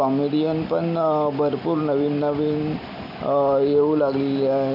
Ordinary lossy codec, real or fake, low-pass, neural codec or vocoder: none; real; 5.4 kHz; none